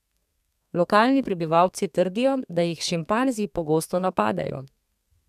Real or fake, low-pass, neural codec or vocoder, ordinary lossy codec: fake; 14.4 kHz; codec, 32 kHz, 1.9 kbps, SNAC; none